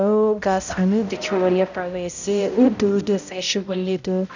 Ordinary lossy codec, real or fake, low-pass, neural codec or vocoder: none; fake; 7.2 kHz; codec, 16 kHz, 0.5 kbps, X-Codec, HuBERT features, trained on balanced general audio